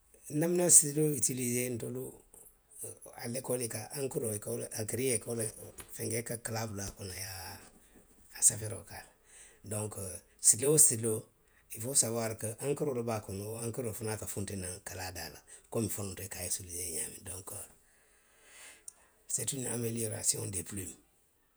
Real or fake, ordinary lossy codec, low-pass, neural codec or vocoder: fake; none; none; vocoder, 48 kHz, 128 mel bands, Vocos